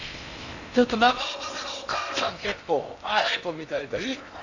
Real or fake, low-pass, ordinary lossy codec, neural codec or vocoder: fake; 7.2 kHz; none; codec, 16 kHz in and 24 kHz out, 0.8 kbps, FocalCodec, streaming, 65536 codes